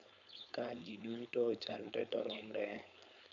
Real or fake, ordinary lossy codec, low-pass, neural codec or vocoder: fake; none; 7.2 kHz; codec, 16 kHz, 4.8 kbps, FACodec